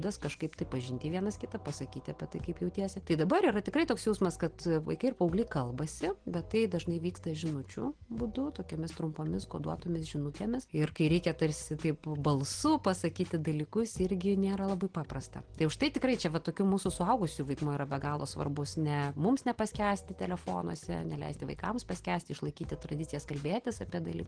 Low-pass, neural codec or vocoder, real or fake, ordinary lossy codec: 9.9 kHz; none; real; Opus, 16 kbps